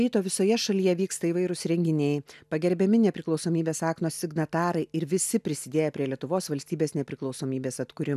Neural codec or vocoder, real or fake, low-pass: none; real; 14.4 kHz